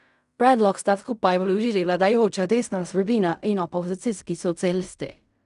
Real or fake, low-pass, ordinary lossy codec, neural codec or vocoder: fake; 10.8 kHz; none; codec, 16 kHz in and 24 kHz out, 0.4 kbps, LongCat-Audio-Codec, fine tuned four codebook decoder